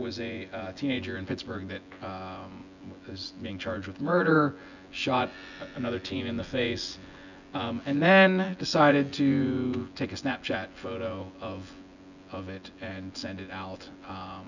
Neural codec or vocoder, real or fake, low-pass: vocoder, 24 kHz, 100 mel bands, Vocos; fake; 7.2 kHz